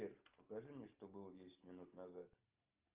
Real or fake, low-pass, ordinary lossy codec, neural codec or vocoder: real; 3.6 kHz; Opus, 24 kbps; none